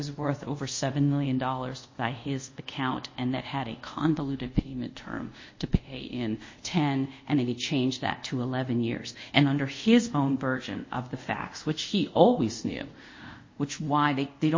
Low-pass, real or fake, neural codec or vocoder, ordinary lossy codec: 7.2 kHz; fake; codec, 24 kHz, 0.5 kbps, DualCodec; MP3, 32 kbps